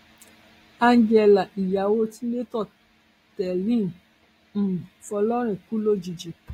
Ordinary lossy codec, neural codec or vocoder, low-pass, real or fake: AAC, 48 kbps; none; 19.8 kHz; real